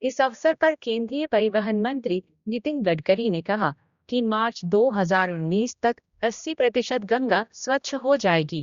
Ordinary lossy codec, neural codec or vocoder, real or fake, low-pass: Opus, 64 kbps; codec, 16 kHz, 1 kbps, X-Codec, HuBERT features, trained on general audio; fake; 7.2 kHz